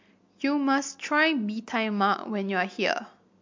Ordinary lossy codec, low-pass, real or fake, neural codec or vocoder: MP3, 48 kbps; 7.2 kHz; real; none